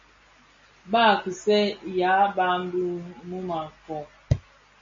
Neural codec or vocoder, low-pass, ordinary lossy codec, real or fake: none; 7.2 kHz; MP3, 32 kbps; real